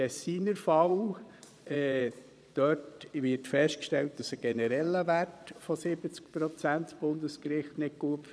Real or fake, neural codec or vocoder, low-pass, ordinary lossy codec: fake; vocoder, 22.05 kHz, 80 mel bands, Vocos; none; none